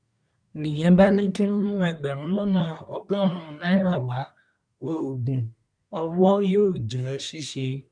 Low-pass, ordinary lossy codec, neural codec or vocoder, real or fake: 9.9 kHz; none; codec, 24 kHz, 1 kbps, SNAC; fake